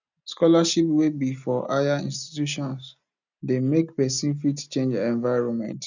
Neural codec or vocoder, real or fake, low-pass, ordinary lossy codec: none; real; 7.2 kHz; none